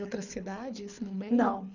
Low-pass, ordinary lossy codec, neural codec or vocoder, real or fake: 7.2 kHz; none; codec, 24 kHz, 6 kbps, HILCodec; fake